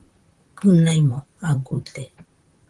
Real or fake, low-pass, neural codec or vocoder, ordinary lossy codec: fake; 10.8 kHz; vocoder, 44.1 kHz, 128 mel bands, Pupu-Vocoder; Opus, 24 kbps